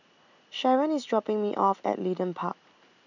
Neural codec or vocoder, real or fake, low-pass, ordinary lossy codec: none; real; 7.2 kHz; none